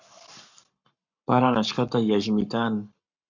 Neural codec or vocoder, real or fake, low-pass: codec, 44.1 kHz, 7.8 kbps, Pupu-Codec; fake; 7.2 kHz